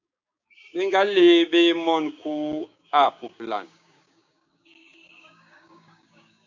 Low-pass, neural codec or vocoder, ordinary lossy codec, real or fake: 7.2 kHz; codec, 16 kHz, 6 kbps, DAC; AAC, 48 kbps; fake